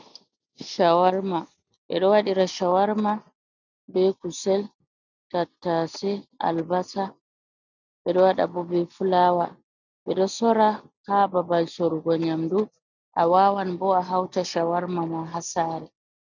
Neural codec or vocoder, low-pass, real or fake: none; 7.2 kHz; real